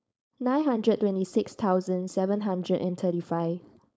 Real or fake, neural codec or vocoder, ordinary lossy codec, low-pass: fake; codec, 16 kHz, 4.8 kbps, FACodec; none; none